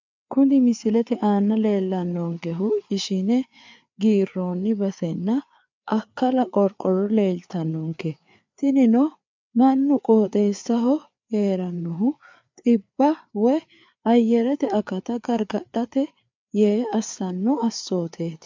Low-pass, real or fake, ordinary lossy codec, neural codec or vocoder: 7.2 kHz; fake; MP3, 64 kbps; codec, 16 kHz, 4 kbps, FreqCodec, larger model